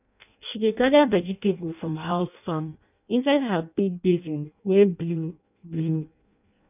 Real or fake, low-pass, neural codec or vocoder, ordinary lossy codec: fake; 3.6 kHz; codec, 16 kHz in and 24 kHz out, 0.6 kbps, FireRedTTS-2 codec; none